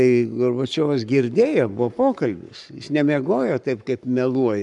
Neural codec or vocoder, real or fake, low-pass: codec, 44.1 kHz, 7.8 kbps, Pupu-Codec; fake; 10.8 kHz